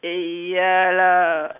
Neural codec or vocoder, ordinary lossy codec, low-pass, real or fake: none; none; 3.6 kHz; real